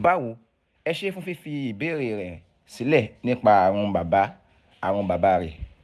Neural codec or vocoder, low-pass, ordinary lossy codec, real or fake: none; none; none; real